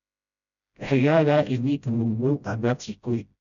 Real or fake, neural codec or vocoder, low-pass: fake; codec, 16 kHz, 0.5 kbps, FreqCodec, smaller model; 7.2 kHz